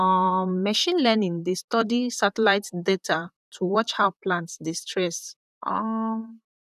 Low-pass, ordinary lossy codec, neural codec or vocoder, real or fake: 14.4 kHz; none; vocoder, 44.1 kHz, 128 mel bands, Pupu-Vocoder; fake